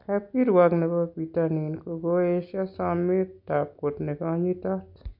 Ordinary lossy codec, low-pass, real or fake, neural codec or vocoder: none; 5.4 kHz; real; none